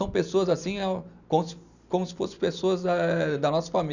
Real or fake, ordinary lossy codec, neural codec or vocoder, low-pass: real; none; none; 7.2 kHz